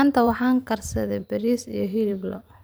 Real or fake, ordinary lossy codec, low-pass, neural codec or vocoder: fake; none; none; vocoder, 44.1 kHz, 128 mel bands every 512 samples, BigVGAN v2